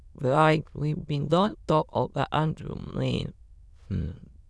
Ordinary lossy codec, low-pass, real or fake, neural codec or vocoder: none; none; fake; autoencoder, 22.05 kHz, a latent of 192 numbers a frame, VITS, trained on many speakers